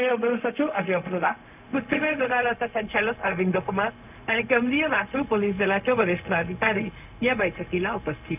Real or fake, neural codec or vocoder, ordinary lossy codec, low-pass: fake; codec, 16 kHz, 0.4 kbps, LongCat-Audio-Codec; none; 3.6 kHz